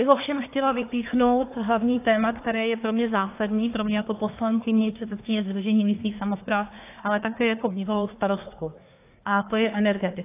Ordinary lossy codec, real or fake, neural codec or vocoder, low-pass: AAC, 24 kbps; fake; codec, 24 kHz, 1 kbps, SNAC; 3.6 kHz